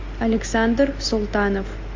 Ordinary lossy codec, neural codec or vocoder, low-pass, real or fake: AAC, 48 kbps; none; 7.2 kHz; real